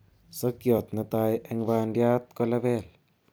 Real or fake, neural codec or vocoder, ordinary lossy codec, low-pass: fake; vocoder, 44.1 kHz, 128 mel bands every 256 samples, BigVGAN v2; none; none